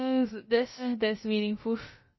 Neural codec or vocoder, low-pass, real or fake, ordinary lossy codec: codec, 16 kHz, about 1 kbps, DyCAST, with the encoder's durations; 7.2 kHz; fake; MP3, 24 kbps